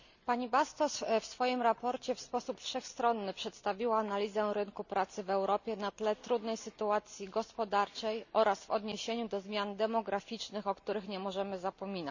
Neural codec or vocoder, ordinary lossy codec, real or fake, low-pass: none; none; real; 7.2 kHz